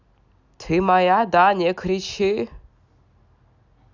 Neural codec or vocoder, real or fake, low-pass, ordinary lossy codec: none; real; 7.2 kHz; none